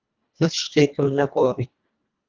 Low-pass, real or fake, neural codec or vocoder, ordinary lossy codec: 7.2 kHz; fake; codec, 24 kHz, 1.5 kbps, HILCodec; Opus, 24 kbps